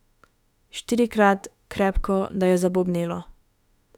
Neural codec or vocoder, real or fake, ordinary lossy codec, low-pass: autoencoder, 48 kHz, 32 numbers a frame, DAC-VAE, trained on Japanese speech; fake; none; 19.8 kHz